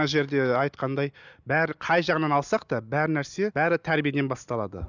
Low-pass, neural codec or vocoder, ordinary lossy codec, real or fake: 7.2 kHz; none; none; real